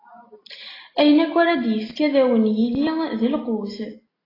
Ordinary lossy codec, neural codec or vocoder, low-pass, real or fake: AAC, 24 kbps; none; 5.4 kHz; real